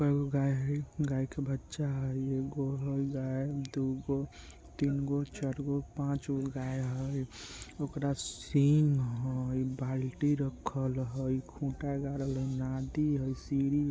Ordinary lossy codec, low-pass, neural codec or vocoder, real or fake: none; none; none; real